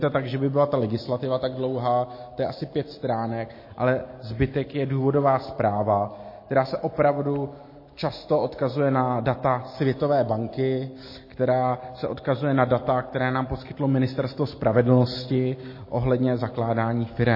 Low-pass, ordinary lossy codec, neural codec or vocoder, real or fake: 5.4 kHz; MP3, 24 kbps; none; real